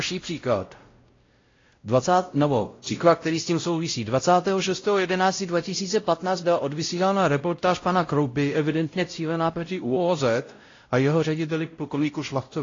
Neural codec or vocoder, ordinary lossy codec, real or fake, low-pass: codec, 16 kHz, 0.5 kbps, X-Codec, WavLM features, trained on Multilingual LibriSpeech; AAC, 32 kbps; fake; 7.2 kHz